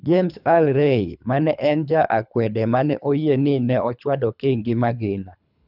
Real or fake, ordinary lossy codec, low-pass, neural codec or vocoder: fake; none; 5.4 kHz; codec, 24 kHz, 3 kbps, HILCodec